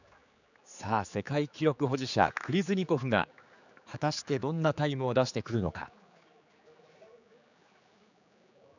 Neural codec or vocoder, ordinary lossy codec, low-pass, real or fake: codec, 16 kHz, 4 kbps, X-Codec, HuBERT features, trained on general audio; none; 7.2 kHz; fake